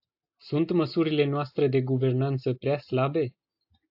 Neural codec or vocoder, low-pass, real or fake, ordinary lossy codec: none; 5.4 kHz; real; AAC, 48 kbps